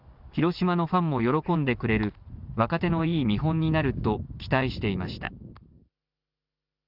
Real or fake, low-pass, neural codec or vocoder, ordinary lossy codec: real; 5.4 kHz; none; none